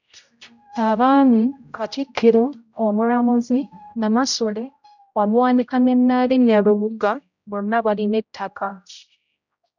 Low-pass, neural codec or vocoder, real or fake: 7.2 kHz; codec, 16 kHz, 0.5 kbps, X-Codec, HuBERT features, trained on general audio; fake